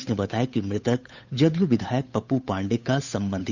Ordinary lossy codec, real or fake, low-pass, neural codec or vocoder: none; fake; 7.2 kHz; codec, 16 kHz, 8 kbps, FunCodec, trained on Chinese and English, 25 frames a second